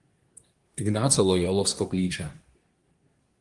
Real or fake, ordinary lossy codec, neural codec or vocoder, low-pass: fake; Opus, 24 kbps; codec, 24 kHz, 1 kbps, SNAC; 10.8 kHz